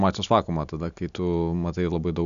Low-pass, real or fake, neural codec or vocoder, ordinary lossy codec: 7.2 kHz; real; none; MP3, 96 kbps